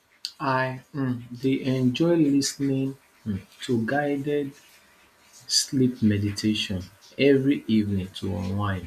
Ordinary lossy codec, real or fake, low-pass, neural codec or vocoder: none; real; 14.4 kHz; none